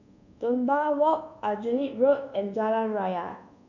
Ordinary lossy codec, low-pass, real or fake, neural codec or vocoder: none; 7.2 kHz; fake; codec, 24 kHz, 1.2 kbps, DualCodec